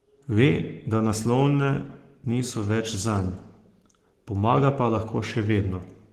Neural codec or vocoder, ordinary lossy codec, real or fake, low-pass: codec, 44.1 kHz, 7.8 kbps, Pupu-Codec; Opus, 16 kbps; fake; 14.4 kHz